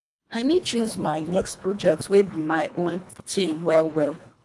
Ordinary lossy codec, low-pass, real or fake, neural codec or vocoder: none; none; fake; codec, 24 kHz, 1.5 kbps, HILCodec